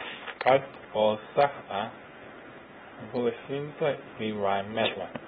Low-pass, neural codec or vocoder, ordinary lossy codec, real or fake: 10.8 kHz; codec, 24 kHz, 0.9 kbps, WavTokenizer, small release; AAC, 16 kbps; fake